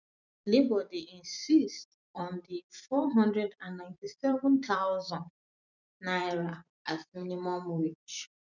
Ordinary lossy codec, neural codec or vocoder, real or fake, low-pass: none; vocoder, 44.1 kHz, 128 mel bands every 256 samples, BigVGAN v2; fake; 7.2 kHz